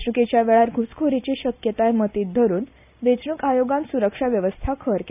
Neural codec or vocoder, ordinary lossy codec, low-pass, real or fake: none; none; 3.6 kHz; real